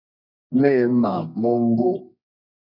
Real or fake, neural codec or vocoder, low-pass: fake; codec, 24 kHz, 0.9 kbps, WavTokenizer, medium music audio release; 5.4 kHz